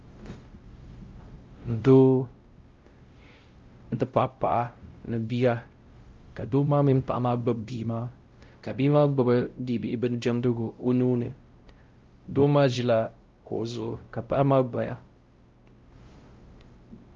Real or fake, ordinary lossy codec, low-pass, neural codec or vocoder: fake; Opus, 32 kbps; 7.2 kHz; codec, 16 kHz, 0.5 kbps, X-Codec, WavLM features, trained on Multilingual LibriSpeech